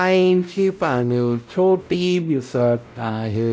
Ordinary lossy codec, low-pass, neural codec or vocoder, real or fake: none; none; codec, 16 kHz, 0.5 kbps, X-Codec, WavLM features, trained on Multilingual LibriSpeech; fake